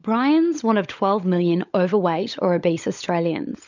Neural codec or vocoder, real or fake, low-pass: codec, 16 kHz, 16 kbps, FunCodec, trained on LibriTTS, 50 frames a second; fake; 7.2 kHz